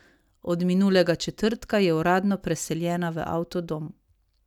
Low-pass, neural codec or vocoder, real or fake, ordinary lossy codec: 19.8 kHz; none; real; none